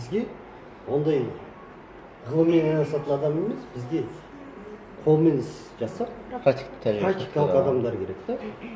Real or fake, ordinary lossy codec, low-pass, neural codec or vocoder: real; none; none; none